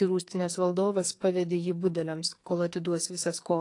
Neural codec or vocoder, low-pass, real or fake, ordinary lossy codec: codec, 44.1 kHz, 2.6 kbps, SNAC; 10.8 kHz; fake; AAC, 48 kbps